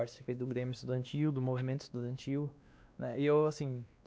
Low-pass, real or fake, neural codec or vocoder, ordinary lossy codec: none; fake; codec, 16 kHz, 2 kbps, X-Codec, WavLM features, trained on Multilingual LibriSpeech; none